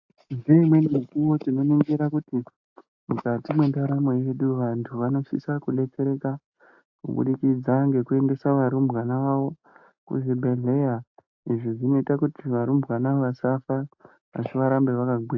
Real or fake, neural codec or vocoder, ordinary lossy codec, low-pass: real; none; AAC, 48 kbps; 7.2 kHz